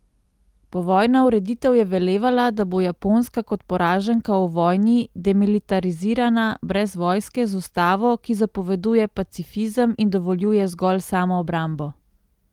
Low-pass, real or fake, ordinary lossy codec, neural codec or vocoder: 19.8 kHz; real; Opus, 24 kbps; none